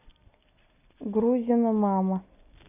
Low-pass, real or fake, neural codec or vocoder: 3.6 kHz; real; none